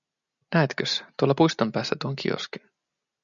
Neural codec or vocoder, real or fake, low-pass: none; real; 7.2 kHz